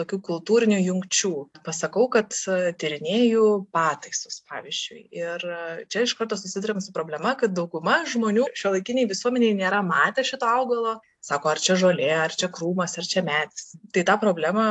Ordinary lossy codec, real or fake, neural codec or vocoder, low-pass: AAC, 64 kbps; real; none; 10.8 kHz